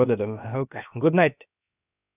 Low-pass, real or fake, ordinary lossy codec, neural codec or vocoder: 3.6 kHz; fake; none; codec, 16 kHz, about 1 kbps, DyCAST, with the encoder's durations